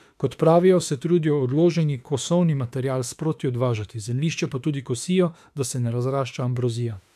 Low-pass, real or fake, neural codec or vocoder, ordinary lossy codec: 14.4 kHz; fake; autoencoder, 48 kHz, 32 numbers a frame, DAC-VAE, trained on Japanese speech; AAC, 96 kbps